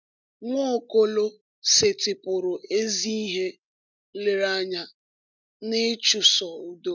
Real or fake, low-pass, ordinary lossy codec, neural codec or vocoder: real; 7.2 kHz; none; none